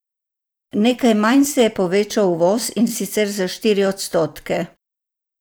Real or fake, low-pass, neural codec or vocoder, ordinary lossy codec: fake; none; vocoder, 44.1 kHz, 128 mel bands every 256 samples, BigVGAN v2; none